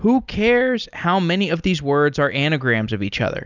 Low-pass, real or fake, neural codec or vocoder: 7.2 kHz; real; none